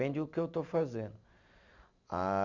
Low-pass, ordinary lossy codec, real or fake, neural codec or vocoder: 7.2 kHz; none; real; none